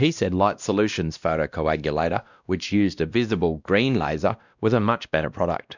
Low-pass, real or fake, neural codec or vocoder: 7.2 kHz; fake; codec, 16 kHz, 1 kbps, X-Codec, WavLM features, trained on Multilingual LibriSpeech